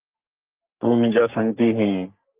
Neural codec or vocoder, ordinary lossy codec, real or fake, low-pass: codec, 44.1 kHz, 3.4 kbps, Pupu-Codec; Opus, 24 kbps; fake; 3.6 kHz